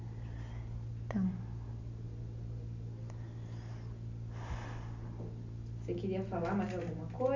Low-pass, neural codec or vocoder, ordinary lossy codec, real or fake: 7.2 kHz; none; none; real